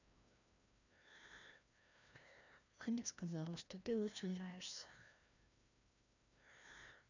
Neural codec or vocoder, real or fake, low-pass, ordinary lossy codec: codec, 16 kHz, 1 kbps, FreqCodec, larger model; fake; 7.2 kHz; none